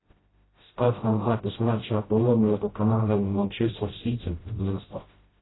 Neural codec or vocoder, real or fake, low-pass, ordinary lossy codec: codec, 16 kHz, 0.5 kbps, FreqCodec, smaller model; fake; 7.2 kHz; AAC, 16 kbps